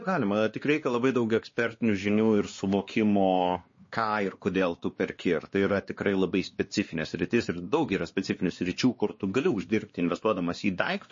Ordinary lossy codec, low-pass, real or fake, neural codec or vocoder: MP3, 32 kbps; 7.2 kHz; fake; codec, 16 kHz, 2 kbps, X-Codec, WavLM features, trained on Multilingual LibriSpeech